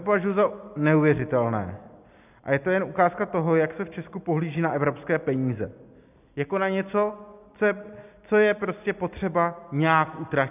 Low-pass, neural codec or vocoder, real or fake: 3.6 kHz; none; real